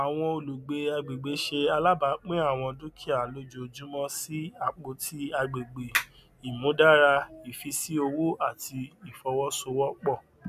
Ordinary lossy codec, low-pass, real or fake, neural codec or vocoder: none; 14.4 kHz; real; none